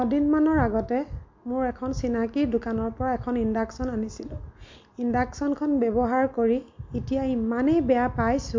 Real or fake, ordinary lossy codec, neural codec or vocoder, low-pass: real; MP3, 48 kbps; none; 7.2 kHz